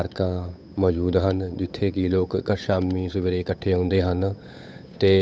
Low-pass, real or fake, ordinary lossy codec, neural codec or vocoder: none; fake; none; codec, 16 kHz, 8 kbps, FunCodec, trained on Chinese and English, 25 frames a second